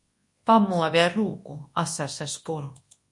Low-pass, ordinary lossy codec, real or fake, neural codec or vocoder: 10.8 kHz; MP3, 48 kbps; fake; codec, 24 kHz, 0.9 kbps, WavTokenizer, large speech release